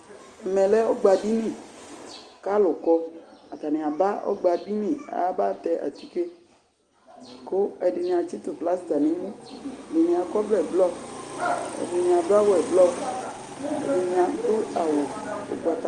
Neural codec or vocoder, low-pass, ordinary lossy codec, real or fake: none; 10.8 kHz; Opus, 32 kbps; real